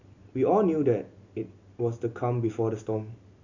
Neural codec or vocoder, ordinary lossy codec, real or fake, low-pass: none; none; real; 7.2 kHz